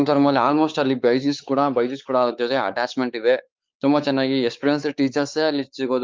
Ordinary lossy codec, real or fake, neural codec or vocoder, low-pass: Opus, 24 kbps; fake; codec, 16 kHz, 4 kbps, X-Codec, WavLM features, trained on Multilingual LibriSpeech; 7.2 kHz